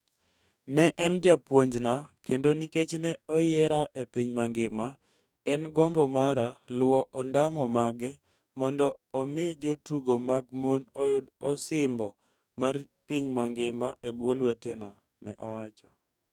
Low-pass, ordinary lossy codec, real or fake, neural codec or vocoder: 19.8 kHz; none; fake; codec, 44.1 kHz, 2.6 kbps, DAC